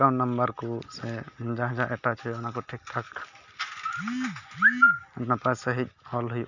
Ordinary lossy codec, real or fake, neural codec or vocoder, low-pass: none; real; none; 7.2 kHz